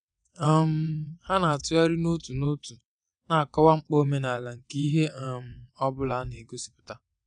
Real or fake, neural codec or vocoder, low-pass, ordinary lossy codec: fake; vocoder, 22.05 kHz, 80 mel bands, WaveNeXt; 9.9 kHz; none